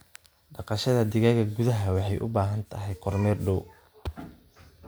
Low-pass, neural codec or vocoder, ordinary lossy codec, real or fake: none; none; none; real